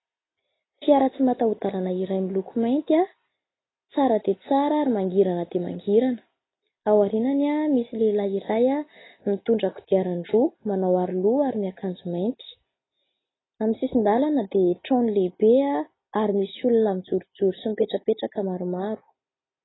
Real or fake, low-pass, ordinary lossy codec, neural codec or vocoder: real; 7.2 kHz; AAC, 16 kbps; none